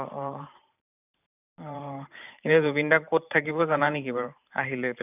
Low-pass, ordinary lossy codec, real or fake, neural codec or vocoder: 3.6 kHz; none; fake; vocoder, 44.1 kHz, 128 mel bands every 512 samples, BigVGAN v2